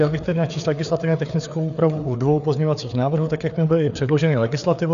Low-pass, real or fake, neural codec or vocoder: 7.2 kHz; fake; codec, 16 kHz, 4 kbps, FreqCodec, larger model